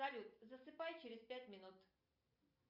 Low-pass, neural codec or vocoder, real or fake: 5.4 kHz; none; real